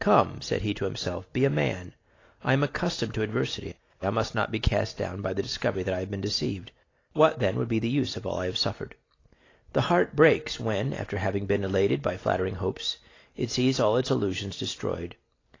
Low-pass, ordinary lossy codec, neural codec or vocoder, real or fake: 7.2 kHz; AAC, 32 kbps; none; real